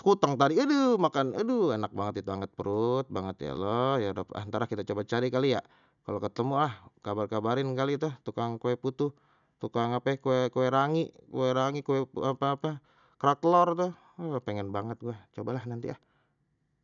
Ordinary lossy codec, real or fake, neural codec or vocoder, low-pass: none; real; none; 7.2 kHz